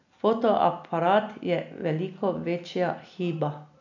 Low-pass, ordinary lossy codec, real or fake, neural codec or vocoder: 7.2 kHz; none; real; none